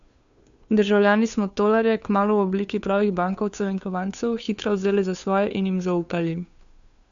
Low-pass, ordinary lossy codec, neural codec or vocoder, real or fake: 7.2 kHz; none; codec, 16 kHz, 2 kbps, FunCodec, trained on Chinese and English, 25 frames a second; fake